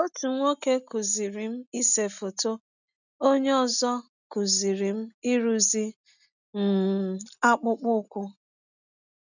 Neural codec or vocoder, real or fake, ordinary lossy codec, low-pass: none; real; none; 7.2 kHz